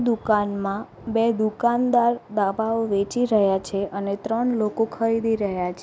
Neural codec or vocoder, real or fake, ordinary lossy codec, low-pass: none; real; none; none